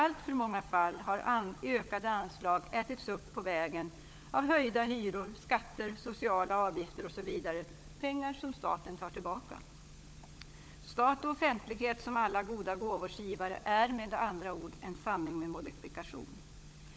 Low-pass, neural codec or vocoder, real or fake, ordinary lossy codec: none; codec, 16 kHz, 16 kbps, FunCodec, trained on LibriTTS, 50 frames a second; fake; none